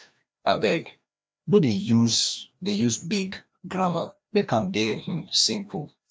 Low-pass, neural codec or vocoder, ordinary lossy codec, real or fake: none; codec, 16 kHz, 1 kbps, FreqCodec, larger model; none; fake